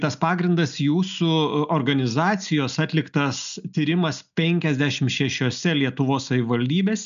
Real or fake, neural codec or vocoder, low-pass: real; none; 7.2 kHz